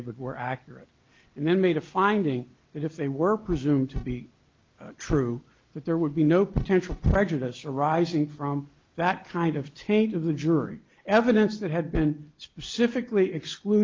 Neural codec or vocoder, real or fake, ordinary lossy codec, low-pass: none; real; Opus, 32 kbps; 7.2 kHz